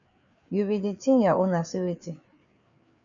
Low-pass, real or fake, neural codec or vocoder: 7.2 kHz; fake; codec, 16 kHz, 4 kbps, FreqCodec, larger model